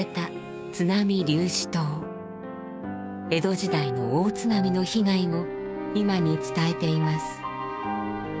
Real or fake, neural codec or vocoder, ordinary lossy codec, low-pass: fake; codec, 16 kHz, 6 kbps, DAC; none; none